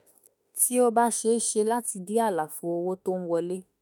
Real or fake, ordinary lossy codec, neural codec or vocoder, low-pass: fake; none; autoencoder, 48 kHz, 32 numbers a frame, DAC-VAE, trained on Japanese speech; none